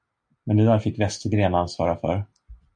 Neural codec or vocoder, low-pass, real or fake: none; 9.9 kHz; real